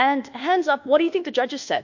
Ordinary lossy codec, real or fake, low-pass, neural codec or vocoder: MP3, 48 kbps; fake; 7.2 kHz; codec, 24 kHz, 1.2 kbps, DualCodec